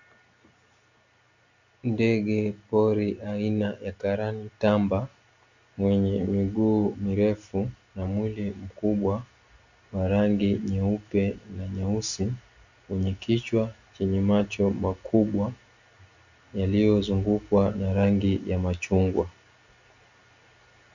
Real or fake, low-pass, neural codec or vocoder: real; 7.2 kHz; none